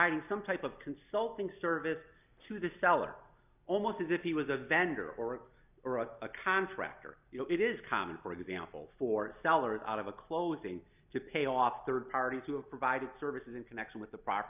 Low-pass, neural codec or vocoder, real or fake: 3.6 kHz; none; real